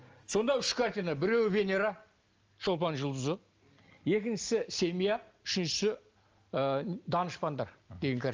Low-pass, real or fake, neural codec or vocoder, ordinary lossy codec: 7.2 kHz; real; none; Opus, 24 kbps